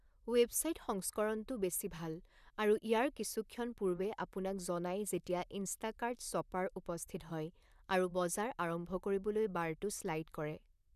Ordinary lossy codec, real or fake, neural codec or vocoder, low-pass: none; fake; vocoder, 44.1 kHz, 128 mel bands, Pupu-Vocoder; 14.4 kHz